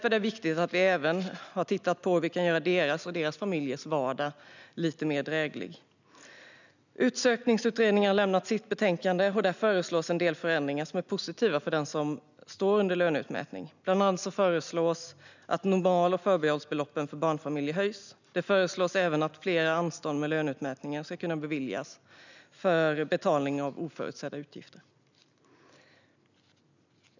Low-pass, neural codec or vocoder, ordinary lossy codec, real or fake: 7.2 kHz; none; none; real